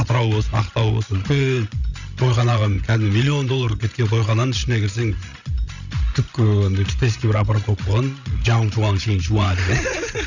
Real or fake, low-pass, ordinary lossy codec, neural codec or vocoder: fake; 7.2 kHz; none; codec, 16 kHz, 16 kbps, FreqCodec, larger model